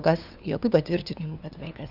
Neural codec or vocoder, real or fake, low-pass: codec, 16 kHz, 2 kbps, FunCodec, trained on LibriTTS, 25 frames a second; fake; 5.4 kHz